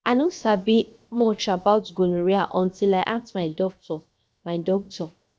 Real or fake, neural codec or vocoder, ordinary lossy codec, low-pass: fake; codec, 16 kHz, 0.7 kbps, FocalCodec; none; none